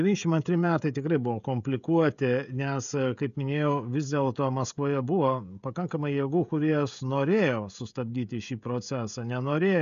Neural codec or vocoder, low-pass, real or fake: codec, 16 kHz, 16 kbps, FreqCodec, smaller model; 7.2 kHz; fake